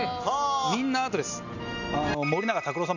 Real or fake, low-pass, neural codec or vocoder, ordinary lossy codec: real; 7.2 kHz; none; none